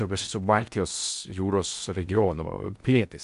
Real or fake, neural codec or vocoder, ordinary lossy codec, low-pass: fake; codec, 16 kHz in and 24 kHz out, 0.6 kbps, FocalCodec, streaming, 4096 codes; MP3, 96 kbps; 10.8 kHz